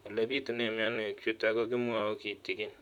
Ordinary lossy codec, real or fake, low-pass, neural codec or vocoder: none; fake; 19.8 kHz; vocoder, 44.1 kHz, 128 mel bands, Pupu-Vocoder